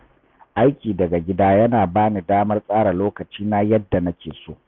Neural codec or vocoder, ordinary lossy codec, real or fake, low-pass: none; none; real; 7.2 kHz